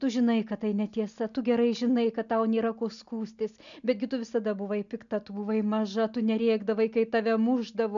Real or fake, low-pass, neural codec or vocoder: real; 7.2 kHz; none